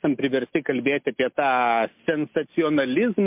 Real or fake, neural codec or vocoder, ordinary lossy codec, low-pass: real; none; MP3, 32 kbps; 3.6 kHz